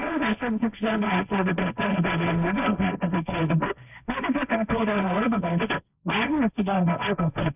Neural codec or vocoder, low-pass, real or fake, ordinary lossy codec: codec, 16 kHz, 1.1 kbps, Voila-Tokenizer; 3.6 kHz; fake; none